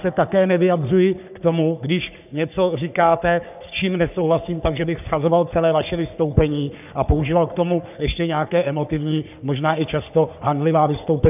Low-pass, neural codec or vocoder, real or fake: 3.6 kHz; codec, 44.1 kHz, 3.4 kbps, Pupu-Codec; fake